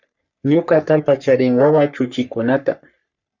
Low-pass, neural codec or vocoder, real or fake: 7.2 kHz; codec, 44.1 kHz, 3.4 kbps, Pupu-Codec; fake